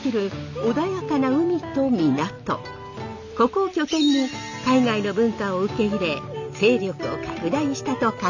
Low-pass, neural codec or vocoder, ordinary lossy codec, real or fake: 7.2 kHz; none; none; real